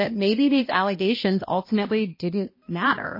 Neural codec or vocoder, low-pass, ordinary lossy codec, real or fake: codec, 16 kHz, 1 kbps, X-Codec, HuBERT features, trained on balanced general audio; 5.4 kHz; MP3, 24 kbps; fake